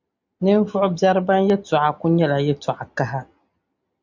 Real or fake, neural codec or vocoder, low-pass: real; none; 7.2 kHz